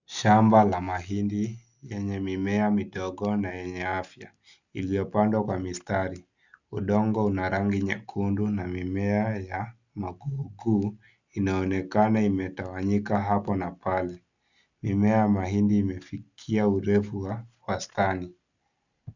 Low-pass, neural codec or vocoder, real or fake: 7.2 kHz; none; real